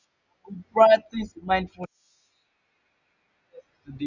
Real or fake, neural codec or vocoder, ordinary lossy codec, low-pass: real; none; none; 7.2 kHz